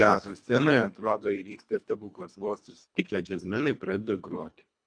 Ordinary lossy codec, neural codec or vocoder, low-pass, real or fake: MP3, 64 kbps; codec, 24 kHz, 1.5 kbps, HILCodec; 9.9 kHz; fake